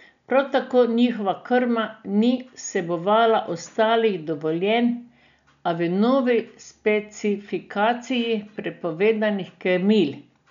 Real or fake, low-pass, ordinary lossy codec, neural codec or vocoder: real; 7.2 kHz; MP3, 96 kbps; none